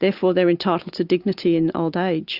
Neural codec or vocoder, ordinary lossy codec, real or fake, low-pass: none; Opus, 64 kbps; real; 5.4 kHz